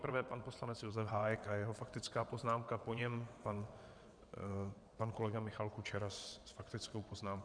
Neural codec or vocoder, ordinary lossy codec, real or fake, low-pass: vocoder, 22.05 kHz, 80 mel bands, WaveNeXt; MP3, 96 kbps; fake; 9.9 kHz